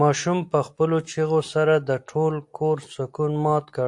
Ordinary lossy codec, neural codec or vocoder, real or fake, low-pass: AAC, 64 kbps; none; real; 10.8 kHz